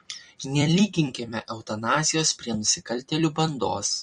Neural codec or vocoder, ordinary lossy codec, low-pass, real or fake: vocoder, 44.1 kHz, 128 mel bands every 256 samples, BigVGAN v2; MP3, 48 kbps; 19.8 kHz; fake